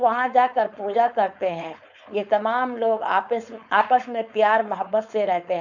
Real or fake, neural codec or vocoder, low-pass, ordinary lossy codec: fake; codec, 16 kHz, 4.8 kbps, FACodec; 7.2 kHz; none